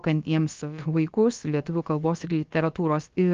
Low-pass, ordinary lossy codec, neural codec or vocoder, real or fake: 7.2 kHz; Opus, 32 kbps; codec, 16 kHz, about 1 kbps, DyCAST, with the encoder's durations; fake